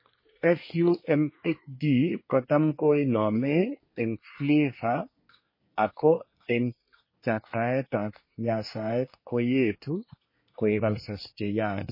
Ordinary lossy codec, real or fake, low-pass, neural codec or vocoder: MP3, 24 kbps; fake; 5.4 kHz; codec, 24 kHz, 1 kbps, SNAC